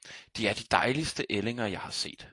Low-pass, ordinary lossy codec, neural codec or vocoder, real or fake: 10.8 kHz; AAC, 48 kbps; none; real